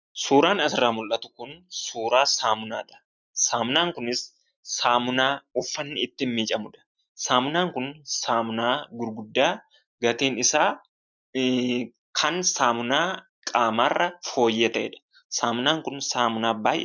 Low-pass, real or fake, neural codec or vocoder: 7.2 kHz; real; none